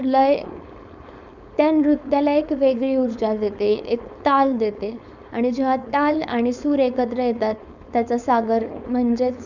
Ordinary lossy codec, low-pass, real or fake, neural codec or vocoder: none; 7.2 kHz; fake; codec, 16 kHz, 4.8 kbps, FACodec